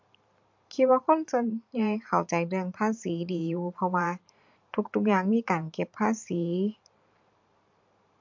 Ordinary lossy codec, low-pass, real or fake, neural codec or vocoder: MP3, 48 kbps; 7.2 kHz; fake; vocoder, 44.1 kHz, 128 mel bands every 512 samples, BigVGAN v2